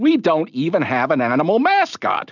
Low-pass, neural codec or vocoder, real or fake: 7.2 kHz; none; real